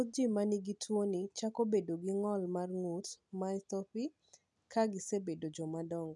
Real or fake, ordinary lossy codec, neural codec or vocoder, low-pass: real; none; none; 10.8 kHz